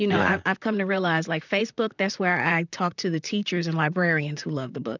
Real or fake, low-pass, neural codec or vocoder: fake; 7.2 kHz; vocoder, 44.1 kHz, 128 mel bands, Pupu-Vocoder